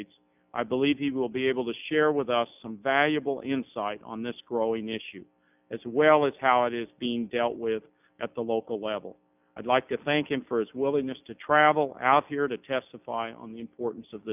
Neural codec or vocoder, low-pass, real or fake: none; 3.6 kHz; real